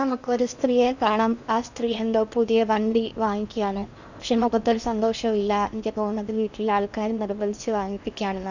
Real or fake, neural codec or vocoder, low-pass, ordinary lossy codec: fake; codec, 16 kHz in and 24 kHz out, 0.8 kbps, FocalCodec, streaming, 65536 codes; 7.2 kHz; none